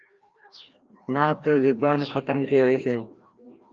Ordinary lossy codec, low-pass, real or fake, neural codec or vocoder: Opus, 24 kbps; 7.2 kHz; fake; codec, 16 kHz, 1 kbps, FreqCodec, larger model